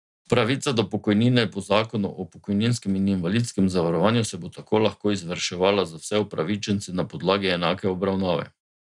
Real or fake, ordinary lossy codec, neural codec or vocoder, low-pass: real; none; none; 10.8 kHz